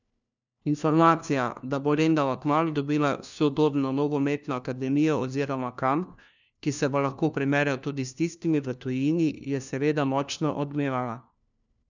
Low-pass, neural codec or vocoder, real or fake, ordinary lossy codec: 7.2 kHz; codec, 16 kHz, 1 kbps, FunCodec, trained on LibriTTS, 50 frames a second; fake; none